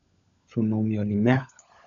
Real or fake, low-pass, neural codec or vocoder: fake; 7.2 kHz; codec, 16 kHz, 16 kbps, FunCodec, trained on LibriTTS, 50 frames a second